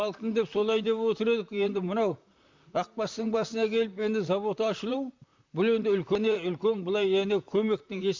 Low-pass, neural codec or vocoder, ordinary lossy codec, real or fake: 7.2 kHz; vocoder, 44.1 kHz, 128 mel bands, Pupu-Vocoder; MP3, 64 kbps; fake